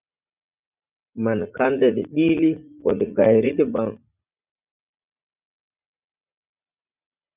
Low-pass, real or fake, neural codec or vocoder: 3.6 kHz; fake; vocoder, 44.1 kHz, 80 mel bands, Vocos